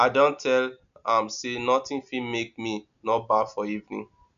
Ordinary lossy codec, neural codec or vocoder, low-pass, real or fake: none; none; 7.2 kHz; real